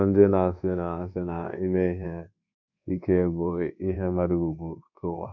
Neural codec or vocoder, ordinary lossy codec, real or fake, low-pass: codec, 24 kHz, 1.2 kbps, DualCodec; none; fake; 7.2 kHz